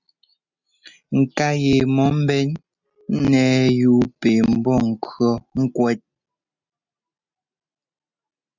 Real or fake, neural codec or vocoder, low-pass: real; none; 7.2 kHz